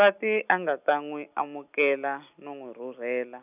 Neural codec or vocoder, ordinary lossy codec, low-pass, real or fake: none; none; 3.6 kHz; real